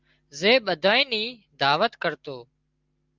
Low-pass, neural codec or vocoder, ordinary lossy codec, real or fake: 7.2 kHz; none; Opus, 32 kbps; real